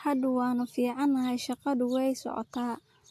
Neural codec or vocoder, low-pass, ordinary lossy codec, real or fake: none; 14.4 kHz; AAC, 64 kbps; real